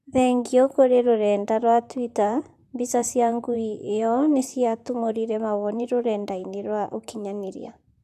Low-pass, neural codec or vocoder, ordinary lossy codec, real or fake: 14.4 kHz; vocoder, 44.1 kHz, 128 mel bands, Pupu-Vocoder; AAC, 96 kbps; fake